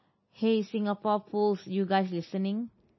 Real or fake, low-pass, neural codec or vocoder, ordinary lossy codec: real; 7.2 kHz; none; MP3, 24 kbps